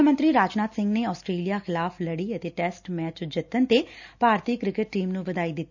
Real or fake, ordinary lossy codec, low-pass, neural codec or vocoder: real; none; 7.2 kHz; none